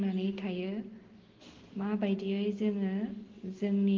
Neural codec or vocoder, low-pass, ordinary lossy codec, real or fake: none; 7.2 kHz; Opus, 16 kbps; real